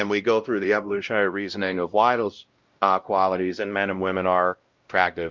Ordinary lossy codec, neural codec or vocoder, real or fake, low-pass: Opus, 24 kbps; codec, 16 kHz, 0.5 kbps, X-Codec, WavLM features, trained on Multilingual LibriSpeech; fake; 7.2 kHz